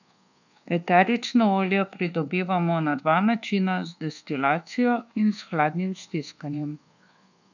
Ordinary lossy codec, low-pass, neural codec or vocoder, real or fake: none; 7.2 kHz; codec, 24 kHz, 1.2 kbps, DualCodec; fake